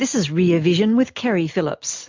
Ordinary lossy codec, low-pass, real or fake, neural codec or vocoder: MP3, 64 kbps; 7.2 kHz; real; none